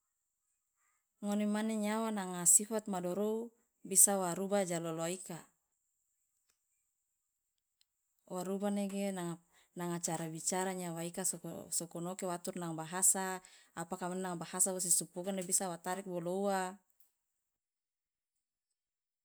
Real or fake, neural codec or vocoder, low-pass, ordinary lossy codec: real; none; none; none